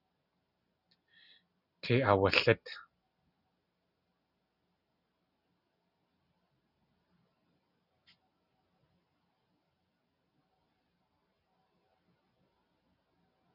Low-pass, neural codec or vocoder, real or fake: 5.4 kHz; none; real